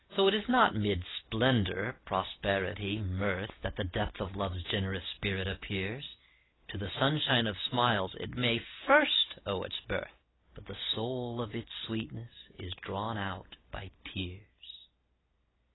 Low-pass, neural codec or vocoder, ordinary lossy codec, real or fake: 7.2 kHz; none; AAC, 16 kbps; real